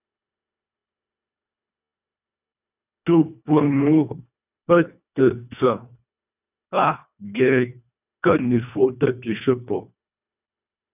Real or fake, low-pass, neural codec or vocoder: fake; 3.6 kHz; codec, 24 kHz, 1.5 kbps, HILCodec